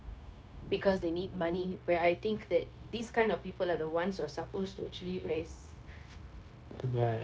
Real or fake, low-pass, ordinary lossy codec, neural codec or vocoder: fake; none; none; codec, 16 kHz, 0.9 kbps, LongCat-Audio-Codec